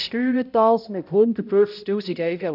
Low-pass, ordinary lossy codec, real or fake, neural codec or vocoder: 5.4 kHz; none; fake; codec, 16 kHz, 0.5 kbps, X-Codec, HuBERT features, trained on balanced general audio